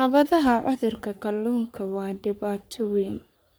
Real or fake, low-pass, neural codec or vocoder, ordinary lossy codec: fake; none; codec, 44.1 kHz, 3.4 kbps, Pupu-Codec; none